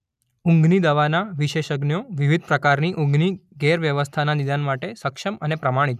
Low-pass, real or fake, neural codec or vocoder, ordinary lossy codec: 14.4 kHz; real; none; none